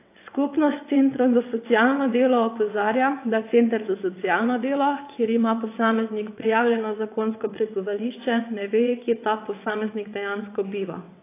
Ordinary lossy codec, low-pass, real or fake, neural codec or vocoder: AAC, 24 kbps; 3.6 kHz; fake; vocoder, 22.05 kHz, 80 mel bands, WaveNeXt